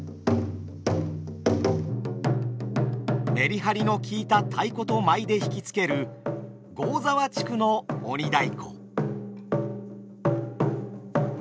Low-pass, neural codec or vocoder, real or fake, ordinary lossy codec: none; none; real; none